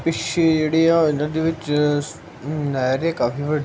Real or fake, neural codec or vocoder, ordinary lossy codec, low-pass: real; none; none; none